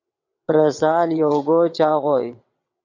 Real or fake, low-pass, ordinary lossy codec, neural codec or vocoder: fake; 7.2 kHz; AAC, 48 kbps; vocoder, 22.05 kHz, 80 mel bands, WaveNeXt